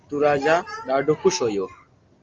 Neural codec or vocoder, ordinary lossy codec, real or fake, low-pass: none; Opus, 24 kbps; real; 7.2 kHz